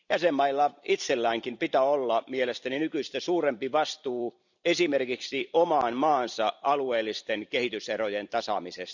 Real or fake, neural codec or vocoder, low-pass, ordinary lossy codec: fake; vocoder, 44.1 kHz, 128 mel bands every 256 samples, BigVGAN v2; 7.2 kHz; none